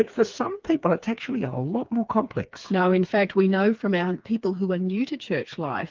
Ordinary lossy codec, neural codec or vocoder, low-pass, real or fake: Opus, 32 kbps; codec, 16 kHz, 4 kbps, FreqCodec, smaller model; 7.2 kHz; fake